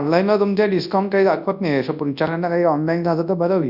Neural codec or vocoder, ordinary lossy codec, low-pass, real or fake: codec, 24 kHz, 0.9 kbps, WavTokenizer, large speech release; none; 5.4 kHz; fake